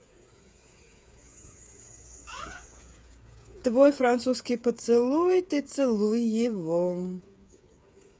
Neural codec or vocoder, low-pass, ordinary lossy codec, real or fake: codec, 16 kHz, 8 kbps, FreqCodec, smaller model; none; none; fake